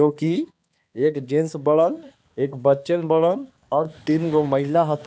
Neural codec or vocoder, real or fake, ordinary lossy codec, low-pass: codec, 16 kHz, 2 kbps, X-Codec, HuBERT features, trained on balanced general audio; fake; none; none